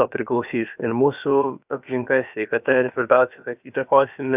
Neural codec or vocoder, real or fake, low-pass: codec, 16 kHz, about 1 kbps, DyCAST, with the encoder's durations; fake; 3.6 kHz